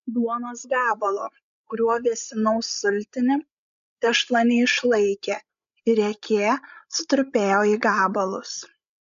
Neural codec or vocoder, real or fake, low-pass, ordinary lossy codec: codec, 16 kHz, 16 kbps, FreqCodec, larger model; fake; 7.2 kHz; MP3, 64 kbps